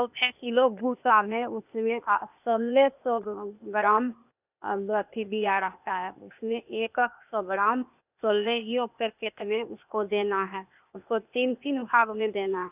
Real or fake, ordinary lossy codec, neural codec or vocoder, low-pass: fake; none; codec, 16 kHz, 0.8 kbps, ZipCodec; 3.6 kHz